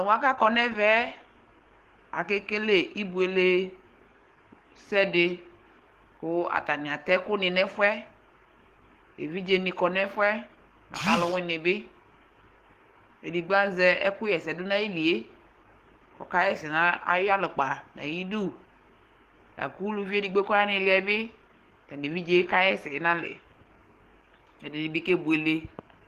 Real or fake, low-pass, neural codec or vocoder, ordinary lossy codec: fake; 14.4 kHz; codec, 44.1 kHz, 7.8 kbps, DAC; Opus, 16 kbps